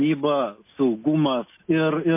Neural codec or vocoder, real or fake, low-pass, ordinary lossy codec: none; real; 3.6 kHz; MP3, 24 kbps